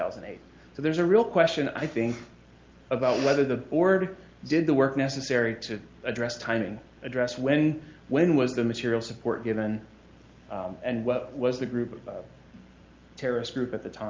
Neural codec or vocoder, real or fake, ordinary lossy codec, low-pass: none; real; Opus, 32 kbps; 7.2 kHz